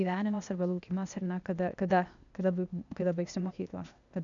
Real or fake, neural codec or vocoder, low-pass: fake; codec, 16 kHz, 0.8 kbps, ZipCodec; 7.2 kHz